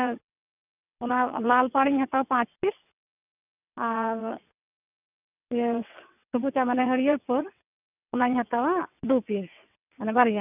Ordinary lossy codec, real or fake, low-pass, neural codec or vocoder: none; fake; 3.6 kHz; vocoder, 22.05 kHz, 80 mel bands, WaveNeXt